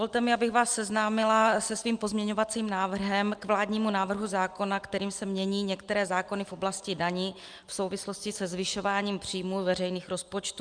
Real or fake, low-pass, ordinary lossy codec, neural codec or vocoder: real; 9.9 kHz; Opus, 32 kbps; none